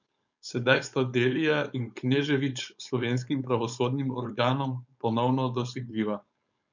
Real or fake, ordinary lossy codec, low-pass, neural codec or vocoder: fake; none; 7.2 kHz; codec, 16 kHz, 4.8 kbps, FACodec